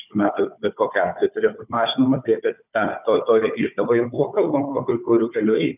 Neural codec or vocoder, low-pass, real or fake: codec, 16 kHz, 4 kbps, FunCodec, trained on Chinese and English, 50 frames a second; 3.6 kHz; fake